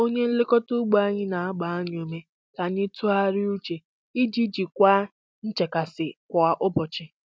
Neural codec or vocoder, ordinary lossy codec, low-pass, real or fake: none; none; none; real